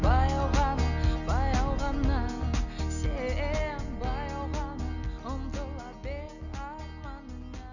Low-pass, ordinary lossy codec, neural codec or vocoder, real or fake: 7.2 kHz; none; none; real